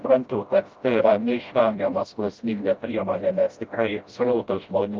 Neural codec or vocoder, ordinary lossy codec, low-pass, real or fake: codec, 16 kHz, 0.5 kbps, FreqCodec, smaller model; Opus, 24 kbps; 7.2 kHz; fake